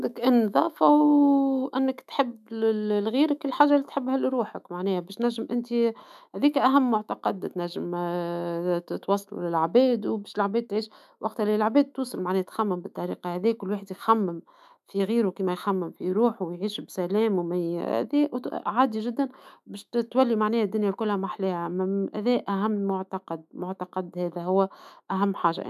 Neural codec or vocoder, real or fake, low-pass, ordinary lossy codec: autoencoder, 48 kHz, 128 numbers a frame, DAC-VAE, trained on Japanese speech; fake; 14.4 kHz; none